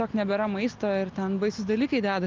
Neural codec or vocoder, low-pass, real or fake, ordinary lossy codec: none; 7.2 kHz; real; Opus, 24 kbps